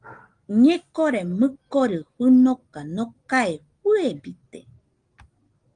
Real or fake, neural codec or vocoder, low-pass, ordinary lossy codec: real; none; 9.9 kHz; Opus, 24 kbps